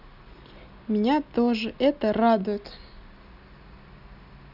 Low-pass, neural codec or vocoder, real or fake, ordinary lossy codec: 5.4 kHz; none; real; none